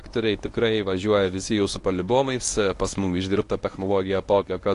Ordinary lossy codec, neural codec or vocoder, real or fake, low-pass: AAC, 48 kbps; codec, 24 kHz, 0.9 kbps, WavTokenizer, medium speech release version 1; fake; 10.8 kHz